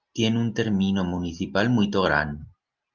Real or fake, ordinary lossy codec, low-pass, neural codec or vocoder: real; Opus, 24 kbps; 7.2 kHz; none